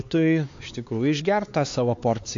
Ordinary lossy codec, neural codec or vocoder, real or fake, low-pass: AAC, 48 kbps; codec, 16 kHz, 4 kbps, X-Codec, HuBERT features, trained on LibriSpeech; fake; 7.2 kHz